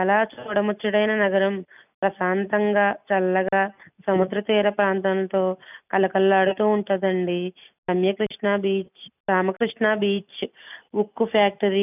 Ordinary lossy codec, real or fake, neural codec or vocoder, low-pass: none; real; none; 3.6 kHz